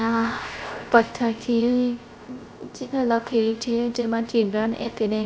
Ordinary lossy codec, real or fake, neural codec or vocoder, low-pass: none; fake; codec, 16 kHz, 0.3 kbps, FocalCodec; none